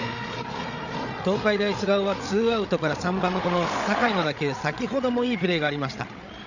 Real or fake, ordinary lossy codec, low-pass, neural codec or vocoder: fake; none; 7.2 kHz; codec, 16 kHz, 8 kbps, FreqCodec, larger model